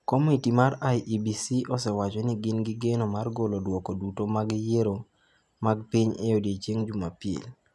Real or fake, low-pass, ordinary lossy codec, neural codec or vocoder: real; none; none; none